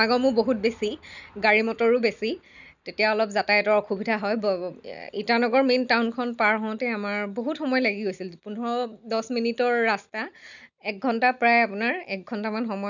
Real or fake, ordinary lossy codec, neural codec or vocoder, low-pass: real; none; none; 7.2 kHz